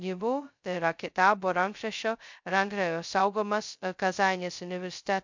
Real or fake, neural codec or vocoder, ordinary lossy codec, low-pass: fake; codec, 16 kHz, 0.2 kbps, FocalCodec; MP3, 64 kbps; 7.2 kHz